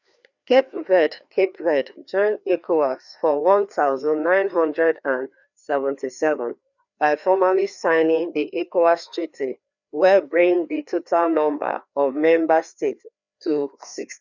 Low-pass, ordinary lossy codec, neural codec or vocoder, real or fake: 7.2 kHz; none; codec, 16 kHz, 2 kbps, FreqCodec, larger model; fake